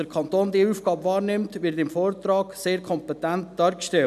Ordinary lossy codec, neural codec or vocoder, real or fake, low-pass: none; none; real; 14.4 kHz